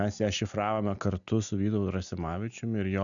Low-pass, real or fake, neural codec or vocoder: 7.2 kHz; real; none